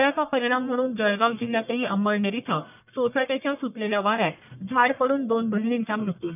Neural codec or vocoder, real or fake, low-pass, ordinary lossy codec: codec, 44.1 kHz, 1.7 kbps, Pupu-Codec; fake; 3.6 kHz; none